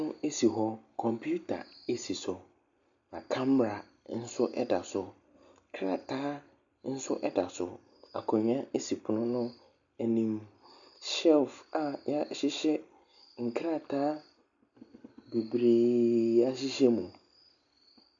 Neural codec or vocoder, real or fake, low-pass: none; real; 7.2 kHz